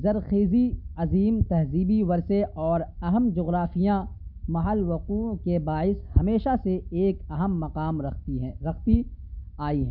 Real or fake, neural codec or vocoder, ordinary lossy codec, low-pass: real; none; none; 5.4 kHz